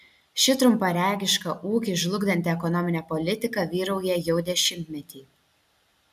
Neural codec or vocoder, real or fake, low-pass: none; real; 14.4 kHz